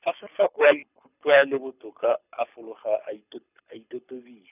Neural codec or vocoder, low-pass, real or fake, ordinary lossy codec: codec, 24 kHz, 6 kbps, HILCodec; 3.6 kHz; fake; none